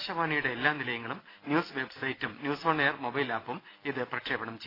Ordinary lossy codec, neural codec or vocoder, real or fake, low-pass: AAC, 24 kbps; none; real; 5.4 kHz